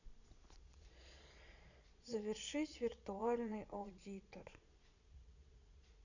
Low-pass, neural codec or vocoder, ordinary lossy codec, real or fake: 7.2 kHz; vocoder, 22.05 kHz, 80 mel bands, Vocos; none; fake